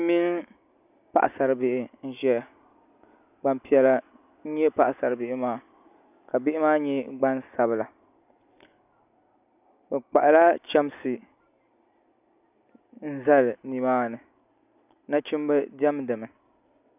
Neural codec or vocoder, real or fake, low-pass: none; real; 3.6 kHz